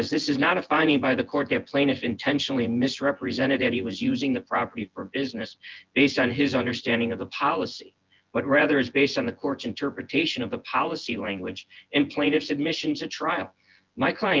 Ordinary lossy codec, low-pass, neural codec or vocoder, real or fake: Opus, 24 kbps; 7.2 kHz; vocoder, 24 kHz, 100 mel bands, Vocos; fake